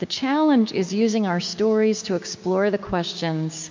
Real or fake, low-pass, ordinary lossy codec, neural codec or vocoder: fake; 7.2 kHz; MP3, 48 kbps; codec, 16 kHz, 6 kbps, DAC